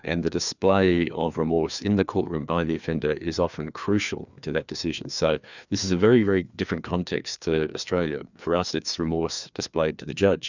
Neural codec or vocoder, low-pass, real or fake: codec, 16 kHz, 2 kbps, FreqCodec, larger model; 7.2 kHz; fake